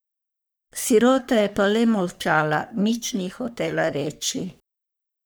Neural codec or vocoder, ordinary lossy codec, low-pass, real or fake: codec, 44.1 kHz, 3.4 kbps, Pupu-Codec; none; none; fake